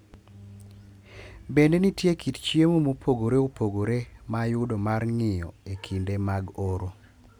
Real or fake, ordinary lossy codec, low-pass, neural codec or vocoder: real; none; 19.8 kHz; none